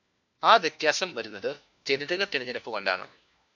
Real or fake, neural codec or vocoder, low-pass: fake; codec, 16 kHz, 1 kbps, FunCodec, trained on LibriTTS, 50 frames a second; 7.2 kHz